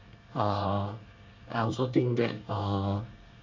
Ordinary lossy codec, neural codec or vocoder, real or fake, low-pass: MP3, 64 kbps; codec, 24 kHz, 1 kbps, SNAC; fake; 7.2 kHz